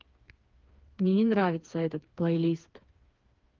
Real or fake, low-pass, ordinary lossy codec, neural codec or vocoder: fake; 7.2 kHz; Opus, 24 kbps; codec, 16 kHz, 4 kbps, FreqCodec, smaller model